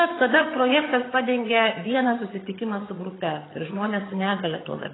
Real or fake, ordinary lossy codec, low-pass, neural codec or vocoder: fake; AAC, 16 kbps; 7.2 kHz; vocoder, 22.05 kHz, 80 mel bands, HiFi-GAN